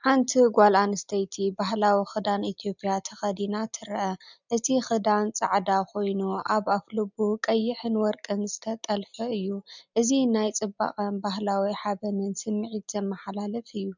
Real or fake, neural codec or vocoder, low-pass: real; none; 7.2 kHz